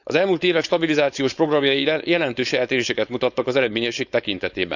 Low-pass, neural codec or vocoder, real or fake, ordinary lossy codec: 7.2 kHz; codec, 16 kHz, 4.8 kbps, FACodec; fake; none